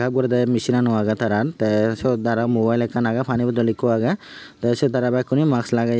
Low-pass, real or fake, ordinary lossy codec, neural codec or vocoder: none; real; none; none